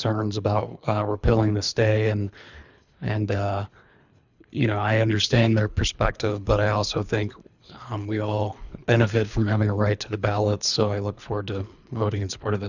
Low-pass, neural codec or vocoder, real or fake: 7.2 kHz; codec, 24 kHz, 3 kbps, HILCodec; fake